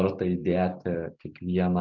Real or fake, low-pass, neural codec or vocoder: real; 7.2 kHz; none